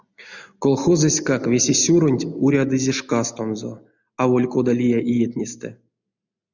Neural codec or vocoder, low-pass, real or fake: none; 7.2 kHz; real